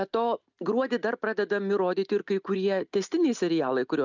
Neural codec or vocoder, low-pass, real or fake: none; 7.2 kHz; real